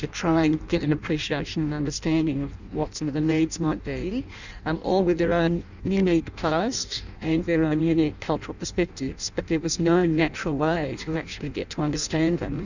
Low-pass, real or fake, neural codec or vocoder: 7.2 kHz; fake; codec, 16 kHz in and 24 kHz out, 0.6 kbps, FireRedTTS-2 codec